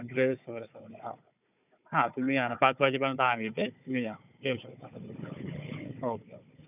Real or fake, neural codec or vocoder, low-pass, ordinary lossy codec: fake; codec, 16 kHz, 4 kbps, FunCodec, trained on Chinese and English, 50 frames a second; 3.6 kHz; none